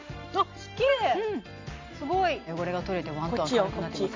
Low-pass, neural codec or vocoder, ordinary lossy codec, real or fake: 7.2 kHz; none; MP3, 64 kbps; real